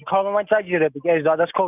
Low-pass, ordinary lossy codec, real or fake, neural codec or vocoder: 3.6 kHz; none; real; none